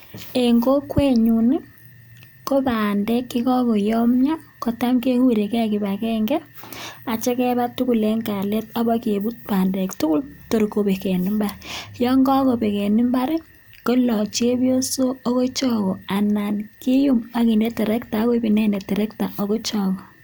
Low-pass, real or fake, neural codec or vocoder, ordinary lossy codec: none; real; none; none